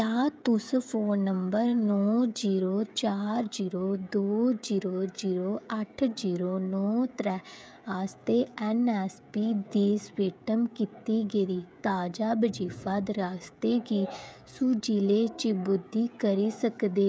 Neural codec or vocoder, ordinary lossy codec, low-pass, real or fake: codec, 16 kHz, 16 kbps, FreqCodec, smaller model; none; none; fake